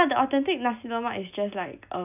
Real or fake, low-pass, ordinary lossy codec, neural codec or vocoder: real; 3.6 kHz; none; none